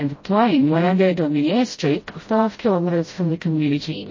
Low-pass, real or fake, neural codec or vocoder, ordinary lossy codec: 7.2 kHz; fake; codec, 16 kHz, 0.5 kbps, FreqCodec, smaller model; MP3, 32 kbps